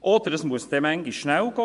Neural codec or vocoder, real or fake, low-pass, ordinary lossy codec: vocoder, 24 kHz, 100 mel bands, Vocos; fake; 10.8 kHz; none